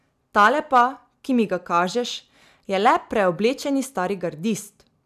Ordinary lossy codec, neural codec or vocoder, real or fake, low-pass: none; none; real; 14.4 kHz